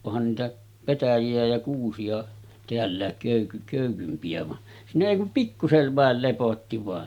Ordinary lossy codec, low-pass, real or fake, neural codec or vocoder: MP3, 96 kbps; 19.8 kHz; real; none